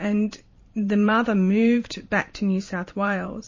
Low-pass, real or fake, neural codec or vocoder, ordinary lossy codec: 7.2 kHz; fake; vocoder, 44.1 kHz, 128 mel bands every 512 samples, BigVGAN v2; MP3, 32 kbps